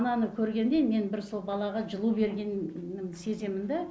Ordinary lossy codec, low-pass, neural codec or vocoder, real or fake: none; none; none; real